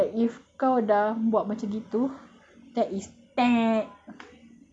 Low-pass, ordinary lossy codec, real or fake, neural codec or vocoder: 9.9 kHz; none; real; none